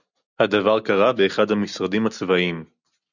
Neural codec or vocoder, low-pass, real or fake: none; 7.2 kHz; real